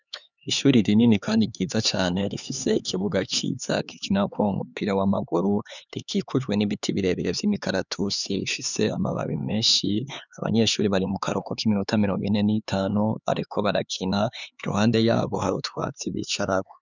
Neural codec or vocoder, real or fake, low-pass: codec, 16 kHz, 4 kbps, X-Codec, HuBERT features, trained on LibriSpeech; fake; 7.2 kHz